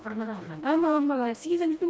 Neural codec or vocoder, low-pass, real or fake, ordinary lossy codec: codec, 16 kHz, 1 kbps, FreqCodec, smaller model; none; fake; none